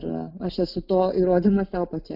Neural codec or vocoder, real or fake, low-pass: none; real; 5.4 kHz